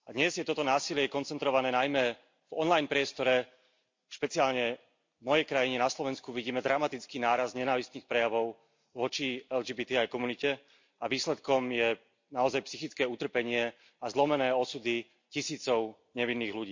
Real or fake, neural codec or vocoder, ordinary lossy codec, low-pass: real; none; MP3, 48 kbps; 7.2 kHz